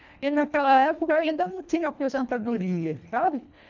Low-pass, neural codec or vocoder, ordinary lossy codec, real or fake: 7.2 kHz; codec, 24 kHz, 1.5 kbps, HILCodec; none; fake